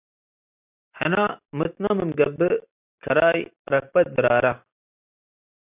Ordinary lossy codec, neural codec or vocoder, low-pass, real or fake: AAC, 32 kbps; none; 3.6 kHz; real